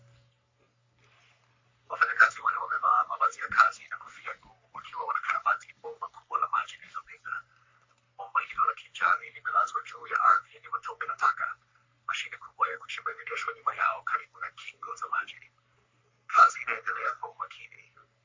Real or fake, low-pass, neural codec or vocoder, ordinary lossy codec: fake; 7.2 kHz; codec, 44.1 kHz, 3.4 kbps, Pupu-Codec; MP3, 48 kbps